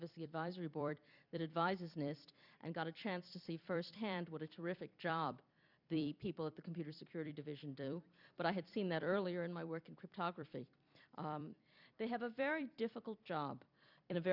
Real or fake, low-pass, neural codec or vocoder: fake; 5.4 kHz; vocoder, 44.1 kHz, 128 mel bands every 256 samples, BigVGAN v2